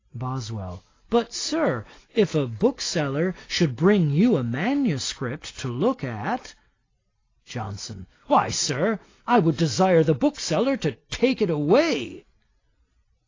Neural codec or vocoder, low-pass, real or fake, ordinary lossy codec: none; 7.2 kHz; real; AAC, 32 kbps